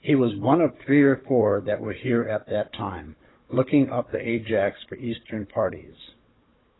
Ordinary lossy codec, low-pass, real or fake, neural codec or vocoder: AAC, 16 kbps; 7.2 kHz; fake; codec, 16 kHz, 16 kbps, FunCodec, trained on Chinese and English, 50 frames a second